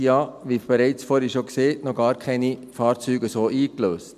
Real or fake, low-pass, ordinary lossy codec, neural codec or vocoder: real; 14.4 kHz; none; none